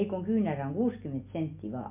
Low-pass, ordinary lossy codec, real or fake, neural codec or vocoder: 3.6 kHz; MP3, 32 kbps; real; none